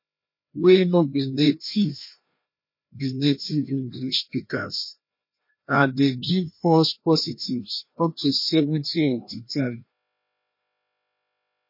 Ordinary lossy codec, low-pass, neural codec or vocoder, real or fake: MP3, 32 kbps; 5.4 kHz; codec, 16 kHz, 1 kbps, FreqCodec, larger model; fake